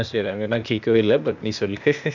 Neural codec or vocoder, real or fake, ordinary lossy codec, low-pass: codec, 16 kHz, 0.8 kbps, ZipCodec; fake; none; 7.2 kHz